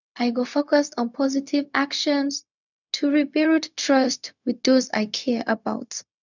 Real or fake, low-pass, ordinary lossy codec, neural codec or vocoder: fake; 7.2 kHz; none; codec, 16 kHz, 0.4 kbps, LongCat-Audio-Codec